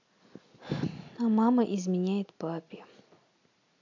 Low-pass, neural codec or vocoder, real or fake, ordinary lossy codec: 7.2 kHz; none; real; AAC, 48 kbps